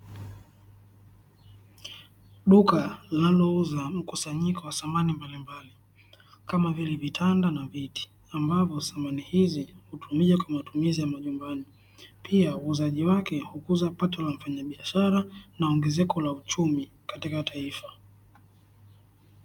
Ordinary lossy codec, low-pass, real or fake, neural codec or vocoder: MP3, 96 kbps; 19.8 kHz; real; none